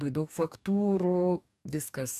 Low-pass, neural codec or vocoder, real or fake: 14.4 kHz; codec, 44.1 kHz, 2.6 kbps, DAC; fake